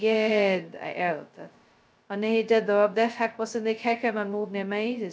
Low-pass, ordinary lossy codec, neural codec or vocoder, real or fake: none; none; codec, 16 kHz, 0.2 kbps, FocalCodec; fake